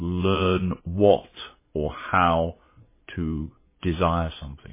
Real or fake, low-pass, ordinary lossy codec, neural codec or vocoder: fake; 3.6 kHz; MP3, 16 kbps; vocoder, 22.05 kHz, 80 mel bands, Vocos